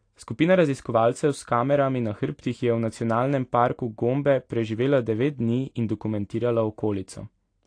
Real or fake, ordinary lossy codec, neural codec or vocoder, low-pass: real; AAC, 48 kbps; none; 9.9 kHz